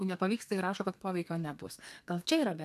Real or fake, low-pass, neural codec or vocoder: fake; 14.4 kHz; codec, 32 kHz, 1.9 kbps, SNAC